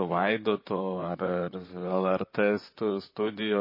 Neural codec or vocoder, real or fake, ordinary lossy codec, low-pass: vocoder, 44.1 kHz, 128 mel bands, Pupu-Vocoder; fake; MP3, 24 kbps; 5.4 kHz